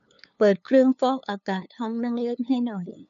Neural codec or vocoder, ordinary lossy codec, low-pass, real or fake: codec, 16 kHz, 2 kbps, FunCodec, trained on LibriTTS, 25 frames a second; none; 7.2 kHz; fake